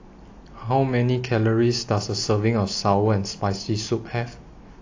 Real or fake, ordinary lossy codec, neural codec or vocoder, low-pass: real; AAC, 48 kbps; none; 7.2 kHz